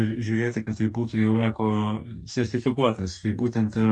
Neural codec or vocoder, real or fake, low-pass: codec, 44.1 kHz, 2.6 kbps, DAC; fake; 10.8 kHz